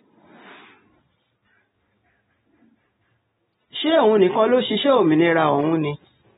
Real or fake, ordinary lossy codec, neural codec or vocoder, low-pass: real; AAC, 16 kbps; none; 19.8 kHz